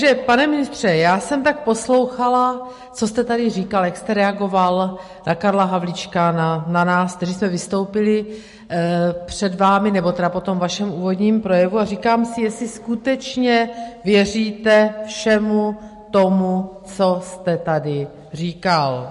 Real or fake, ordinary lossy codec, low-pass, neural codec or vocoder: real; MP3, 48 kbps; 14.4 kHz; none